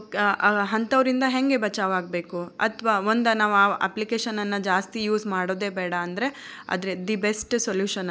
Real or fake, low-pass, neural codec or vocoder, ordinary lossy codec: real; none; none; none